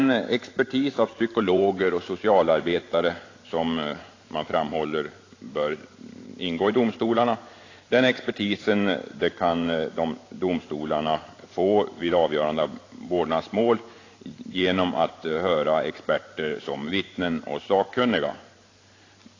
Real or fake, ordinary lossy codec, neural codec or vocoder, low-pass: fake; AAC, 32 kbps; vocoder, 44.1 kHz, 128 mel bands every 512 samples, BigVGAN v2; 7.2 kHz